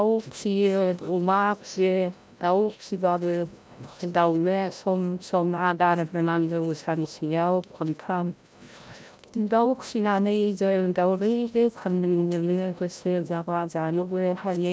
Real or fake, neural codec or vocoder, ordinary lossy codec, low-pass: fake; codec, 16 kHz, 0.5 kbps, FreqCodec, larger model; none; none